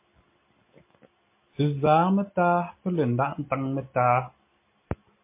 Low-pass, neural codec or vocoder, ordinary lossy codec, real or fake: 3.6 kHz; none; MP3, 24 kbps; real